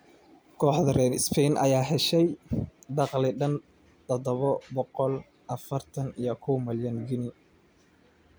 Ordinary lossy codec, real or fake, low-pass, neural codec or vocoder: none; real; none; none